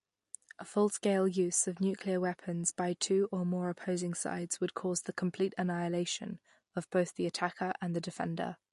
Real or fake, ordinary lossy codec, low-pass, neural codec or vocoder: real; MP3, 48 kbps; 14.4 kHz; none